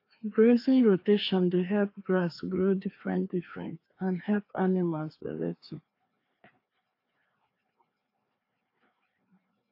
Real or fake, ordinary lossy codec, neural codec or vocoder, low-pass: fake; AAC, 32 kbps; codec, 16 kHz, 2 kbps, FreqCodec, larger model; 5.4 kHz